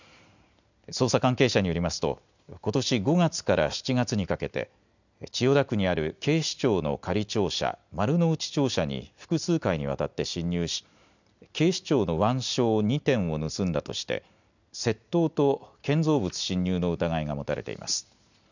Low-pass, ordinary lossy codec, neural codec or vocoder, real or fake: 7.2 kHz; none; none; real